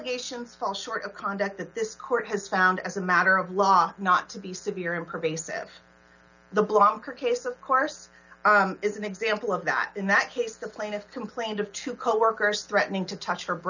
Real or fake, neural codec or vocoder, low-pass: real; none; 7.2 kHz